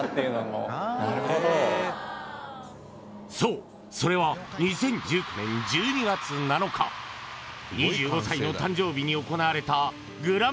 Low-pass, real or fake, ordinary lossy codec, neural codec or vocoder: none; real; none; none